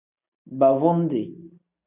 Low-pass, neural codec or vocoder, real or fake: 3.6 kHz; none; real